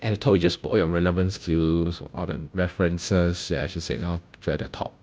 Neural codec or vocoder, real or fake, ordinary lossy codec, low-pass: codec, 16 kHz, 0.5 kbps, FunCodec, trained on Chinese and English, 25 frames a second; fake; none; none